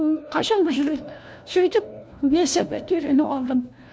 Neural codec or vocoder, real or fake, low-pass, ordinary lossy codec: codec, 16 kHz, 1 kbps, FunCodec, trained on LibriTTS, 50 frames a second; fake; none; none